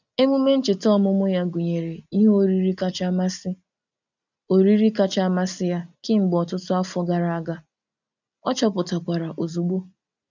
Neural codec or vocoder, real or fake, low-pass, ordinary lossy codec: none; real; 7.2 kHz; none